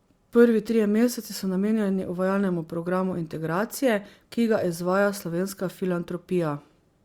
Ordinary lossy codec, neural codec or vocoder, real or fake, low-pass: Opus, 64 kbps; none; real; 19.8 kHz